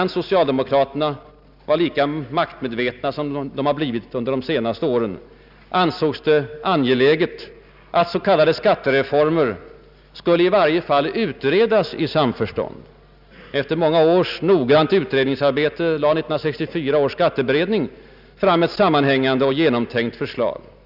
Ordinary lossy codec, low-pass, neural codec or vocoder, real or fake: none; 5.4 kHz; none; real